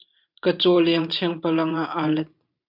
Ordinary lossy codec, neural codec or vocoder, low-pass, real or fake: MP3, 48 kbps; vocoder, 44.1 kHz, 128 mel bands every 512 samples, BigVGAN v2; 5.4 kHz; fake